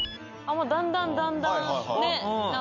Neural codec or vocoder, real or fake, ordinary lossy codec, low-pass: none; real; none; 7.2 kHz